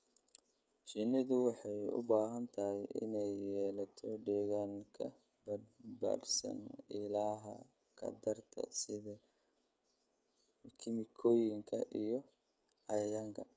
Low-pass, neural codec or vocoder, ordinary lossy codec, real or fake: none; codec, 16 kHz, 16 kbps, FreqCodec, smaller model; none; fake